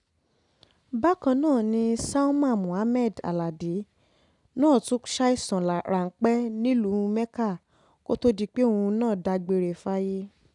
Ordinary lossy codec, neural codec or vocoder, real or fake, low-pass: none; none; real; 10.8 kHz